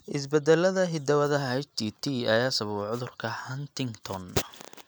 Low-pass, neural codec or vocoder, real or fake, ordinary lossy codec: none; none; real; none